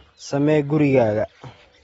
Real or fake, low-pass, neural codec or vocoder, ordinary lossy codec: real; 19.8 kHz; none; AAC, 24 kbps